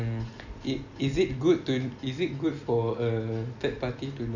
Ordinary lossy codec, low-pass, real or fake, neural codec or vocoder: none; 7.2 kHz; real; none